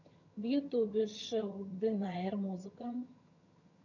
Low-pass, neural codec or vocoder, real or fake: 7.2 kHz; vocoder, 22.05 kHz, 80 mel bands, HiFi-GAN; fake